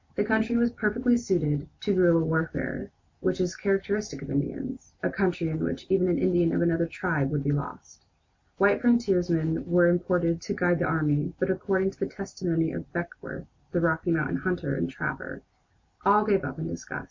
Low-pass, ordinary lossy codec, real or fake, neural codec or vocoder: 7.2 kHz; MP3, 48 kbps; real; none